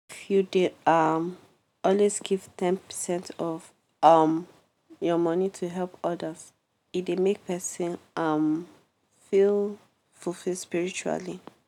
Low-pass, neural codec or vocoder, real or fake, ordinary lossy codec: 19.8 kHz; none; real; none